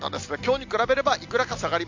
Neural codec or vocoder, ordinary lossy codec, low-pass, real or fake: none; MP3, 64 kbps; 7.2 kHz; real